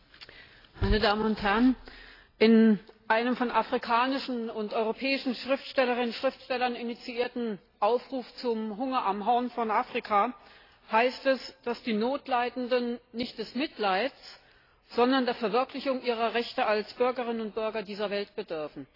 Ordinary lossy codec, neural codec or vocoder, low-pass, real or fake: AAC, 24 kbps; none; 5.4 kHz; real